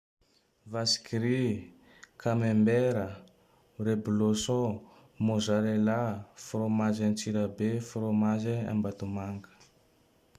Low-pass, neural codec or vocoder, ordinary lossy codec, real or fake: 14.4 kHz; none; Opus, 64 kbps; real